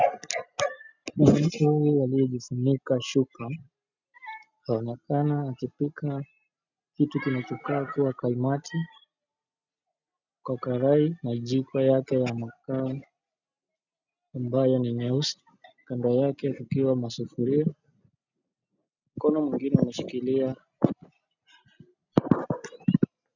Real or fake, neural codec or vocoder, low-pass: real; none; 7.2 kHz